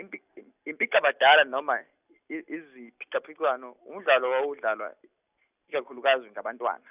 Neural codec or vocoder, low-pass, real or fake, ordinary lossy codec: none; 3.6 kHz; real; none